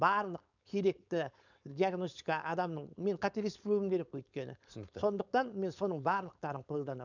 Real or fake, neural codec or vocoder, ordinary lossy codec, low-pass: fake; codec, 16 kHz, 4.8 kbps, FACodec; none; 7.2 kHz